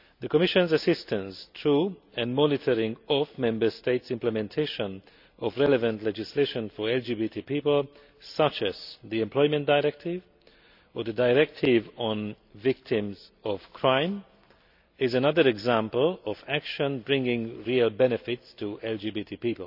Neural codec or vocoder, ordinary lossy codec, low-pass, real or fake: none; none; 5.4 kHz; real